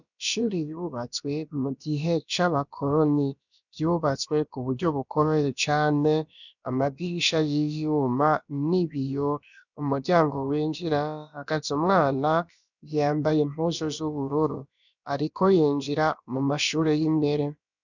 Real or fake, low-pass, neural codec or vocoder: fake; 7.2 kHz; codec, 16 kHz, about 1 kbps, DyCAST, with the encoder's durations